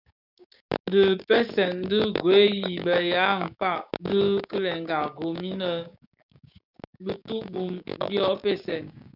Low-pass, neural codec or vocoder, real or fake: 5.4 kHz; codec, 16 kHz, 6 kbps, DAC; fake